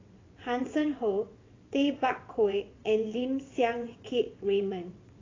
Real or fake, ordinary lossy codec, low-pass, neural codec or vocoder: fake; AAC, 32 kbps; 7.2 kHz; vocoder, 22.05 kHz, 80 mel bands, WaveNeXt